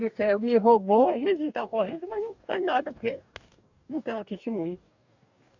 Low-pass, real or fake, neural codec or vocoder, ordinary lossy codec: 7.2 kHz; fake; codec, 44.1 kHz, 2.6 kbps, DAC; none